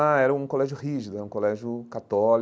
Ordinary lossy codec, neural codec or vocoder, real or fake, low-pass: none; none; real; none